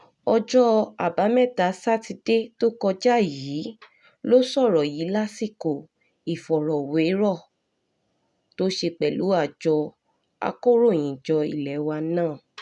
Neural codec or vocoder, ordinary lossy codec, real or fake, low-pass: none; none; real; 10.8 kHz